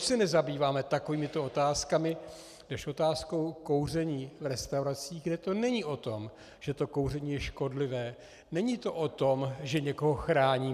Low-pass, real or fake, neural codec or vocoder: 14.4 kHz; real; none